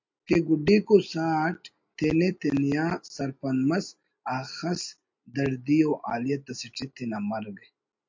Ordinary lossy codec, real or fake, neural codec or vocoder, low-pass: MP3, 48 kbps; real; none; 7.2 kHz